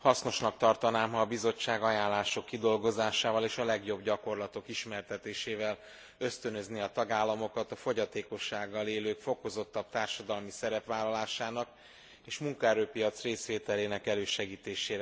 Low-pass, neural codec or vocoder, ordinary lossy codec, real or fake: none; none; none; real